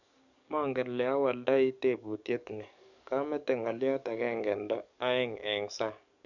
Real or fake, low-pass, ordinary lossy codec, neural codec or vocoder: fake; 7.2 kHz; none; codec, 44.1 kHz, 7.8 kbps, DAC